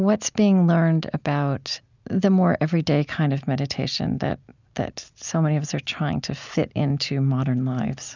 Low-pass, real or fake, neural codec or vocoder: 7.2 kHz; real; none